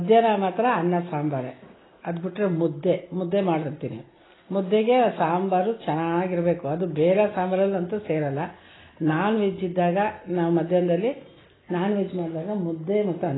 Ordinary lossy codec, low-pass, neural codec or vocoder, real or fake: AAC, 16 kbps; 7.2 kHz; none; real